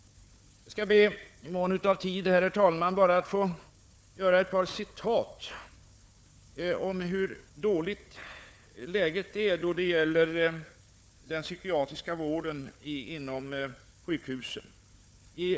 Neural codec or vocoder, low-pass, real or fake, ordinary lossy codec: codec, 16 kHz, 4 kbps, FunCodec, trained on Chinese and English, 50 frames a second; none; fake; none